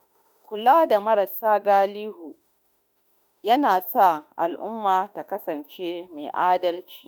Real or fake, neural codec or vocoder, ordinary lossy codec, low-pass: fake; autoencoder, 48 kHz, 32 numbers a frame, DAC-VAE, trained on Japanese speech; none; none